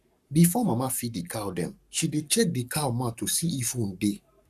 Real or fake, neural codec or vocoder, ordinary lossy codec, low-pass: fake; codec, 44.1 kHz, 7.8 kbps, Pupu-Codec; none; 14.4 kHz